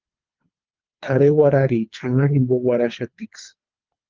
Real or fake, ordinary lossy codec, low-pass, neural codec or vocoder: fake; Opus, 32 kbps; 7.2 kHz; codec, 24 kHz, 3 kbps, HILCodec